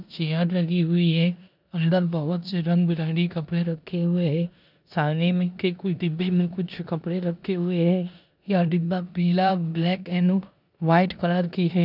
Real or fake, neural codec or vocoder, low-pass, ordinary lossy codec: fake; codec, 16 kHz in and 24 kHz out, 0.9 kbps, LongCat-Audio-Codec, four codebook decoder; 5.4 kHz; none